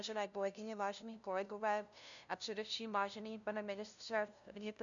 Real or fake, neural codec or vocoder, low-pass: fake; codec, 16 kHz, 0.5 kbps, FunCodec, trained on LibriTTS, 25 frames a second; 7.2 kHz